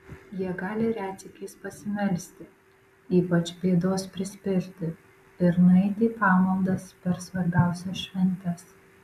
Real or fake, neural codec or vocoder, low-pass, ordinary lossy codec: real; none; 14.4 kHz; MP3, 96 kbps